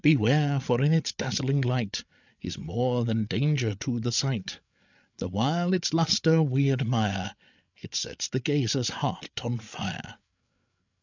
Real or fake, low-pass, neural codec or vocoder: fake; 7.2 kHz; codec, 16 kHz, 8 kbps, FreqCodec, larger model